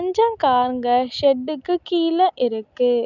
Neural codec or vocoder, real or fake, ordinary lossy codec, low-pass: none; real; none; 7.2 kHz